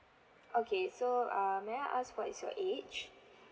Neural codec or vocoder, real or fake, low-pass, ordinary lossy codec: none; real; none; none